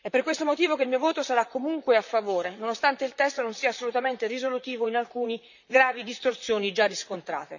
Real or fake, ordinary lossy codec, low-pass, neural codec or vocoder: fake; none; 7.2 kHz; vocoder, 44.1 kHz, 128 mel bands, Pupu-Vocoder